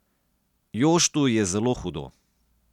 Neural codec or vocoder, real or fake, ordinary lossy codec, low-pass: none; real; none; 19.8 kHz